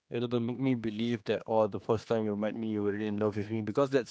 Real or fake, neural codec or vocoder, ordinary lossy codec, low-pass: fake; codec, 16 kHz, 2 kbps, X-Codec, HuBERT features, trained on general audio; none; none